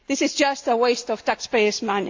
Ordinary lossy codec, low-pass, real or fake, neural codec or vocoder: AAC, 48 kbps; 7.2 kHz; real; none